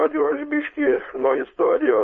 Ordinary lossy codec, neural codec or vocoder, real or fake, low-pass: MP3, 32 kbps; codec, 16 kHz, 4.8 kbps, FACodec; fake; 7.2 kHz